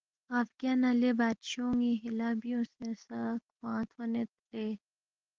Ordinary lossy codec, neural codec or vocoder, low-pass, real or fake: Opus, 16 kbps; none; 7.2 kHz; real